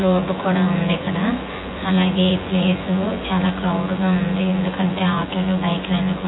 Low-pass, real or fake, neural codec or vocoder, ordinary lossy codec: 7.2 kHz; fake; vocoder, 24 kHz, 100 mel bands, Vocos; AAC, 16 kbps